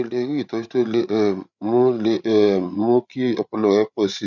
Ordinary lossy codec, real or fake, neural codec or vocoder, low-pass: none; fake; codec, 16 kHz, 8 kbps, FreqCodec, larger model; 7.2 kHz